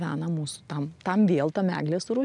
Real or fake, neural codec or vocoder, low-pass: real; none; 10.8 kHz